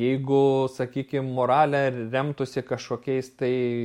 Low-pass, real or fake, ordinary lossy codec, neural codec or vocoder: 19.8 kHz; real; MP3, 64 kbps; none